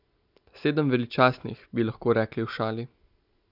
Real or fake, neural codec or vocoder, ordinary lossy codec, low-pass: real; none; none; 5.4 kHz